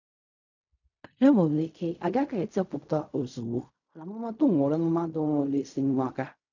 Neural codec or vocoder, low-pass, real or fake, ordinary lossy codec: codec, 16 kHz in and 24 kHz out, 0.4 kbps, LongCat-Audio-Codec, fine tuned four codebook decoder; 7.2 kHz; fake; none